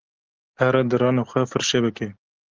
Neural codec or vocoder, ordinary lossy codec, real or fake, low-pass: none; Opus, 16 kbps; real; 7.2 kHz